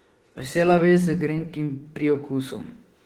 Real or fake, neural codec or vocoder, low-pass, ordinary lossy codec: fake; autoencoder, 48 kHz, 32 numbers a frame, DAC-VAE, trained on Japanese speech; 19.8 kHz; Opus, 16 kbps